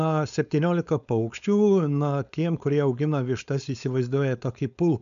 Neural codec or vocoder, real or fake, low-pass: codec, 16 kHz, 4.8 kbps, FACodec; fake; 7.2 kHz